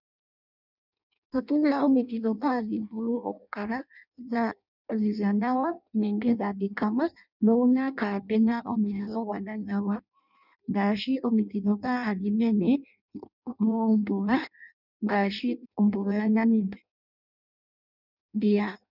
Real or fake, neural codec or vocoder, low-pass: fake; codec, 16 kHz in and 24 kHz out, 0.6 kbps, FireRedTTS-2 codec; 5.4 kHz